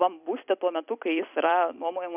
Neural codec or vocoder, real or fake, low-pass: vocoder, 44.1 kHz, 128 mel bands every 256 samples, BigVGAN v2; fake; 3.6 kHz